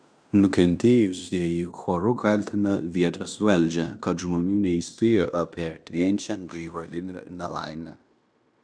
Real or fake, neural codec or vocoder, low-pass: fake; codec, 16 kHz in and 24 kHz out, 0.9 kbps, LongCat-Audio-Codec, fine tuned four codebook decoder; 9.9 kHz